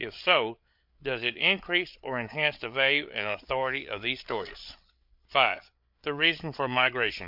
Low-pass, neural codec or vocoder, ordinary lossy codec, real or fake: 5.4 kHz; codec, 16 kHz, 8 kbps, FreqCodec, larger model; MP3, 48 kbps; fake